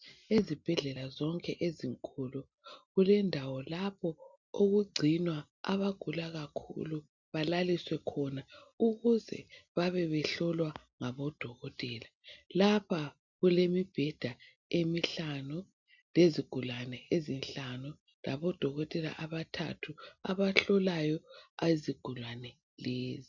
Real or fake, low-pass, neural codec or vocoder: real; 7.2 kHz; none